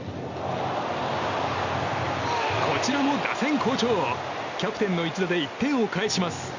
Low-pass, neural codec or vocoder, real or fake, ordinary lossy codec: 7.2 kHz; none; real; Opus, 64 kbps